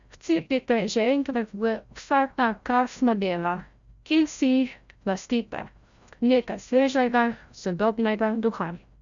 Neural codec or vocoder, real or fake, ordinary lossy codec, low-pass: codec, 16 kHz, 0.5 kbps, FreqCodec, larger model; fake; Opus, 64 kbps; 7.2 kHz